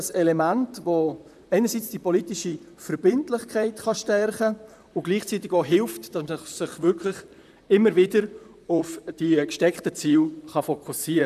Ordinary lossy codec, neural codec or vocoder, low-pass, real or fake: none; vocoder, 44.1 kHz, 128 mel bands, Pupu-Vocoder; 14.4 kHz; fake